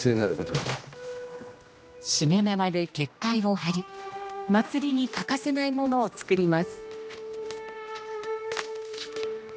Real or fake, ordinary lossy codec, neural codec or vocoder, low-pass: fake; none; codec, 16 kHz, 1 kbps, X-Codec, HuBERT features, trained on general audio; none